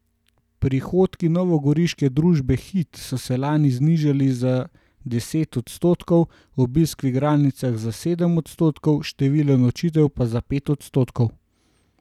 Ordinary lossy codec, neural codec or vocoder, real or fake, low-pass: none; none; real; 19.8 kHz